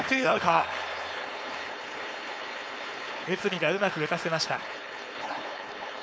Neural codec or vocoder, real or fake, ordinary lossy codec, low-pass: codec, 16 kHz, 4.8 kbps, FACodec; fake; none; none